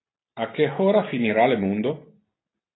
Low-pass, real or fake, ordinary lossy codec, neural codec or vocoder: 7.2 kHz; real; AAC, 16 kbps; none